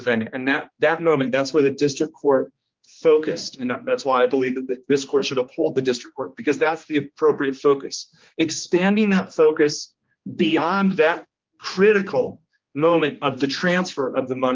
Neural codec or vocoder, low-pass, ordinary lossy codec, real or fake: codec, 16 kHz, 2 kbps, X-Codec, HuBERT features, trained on general audio; 7.2 kHz; Opus, 32 kbps; fake